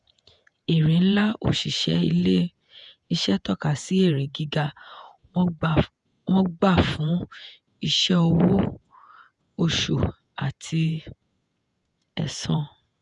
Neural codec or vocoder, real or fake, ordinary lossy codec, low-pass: vocoder, 48 kHz, 128 mel bands, Vocos; fake; none; 10.8 kHz